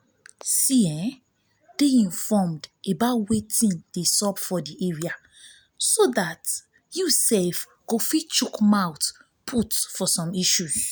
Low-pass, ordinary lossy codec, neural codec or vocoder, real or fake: none; none; none; real